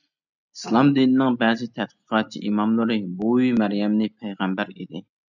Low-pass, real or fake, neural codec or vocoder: 7.2 kHz; real; none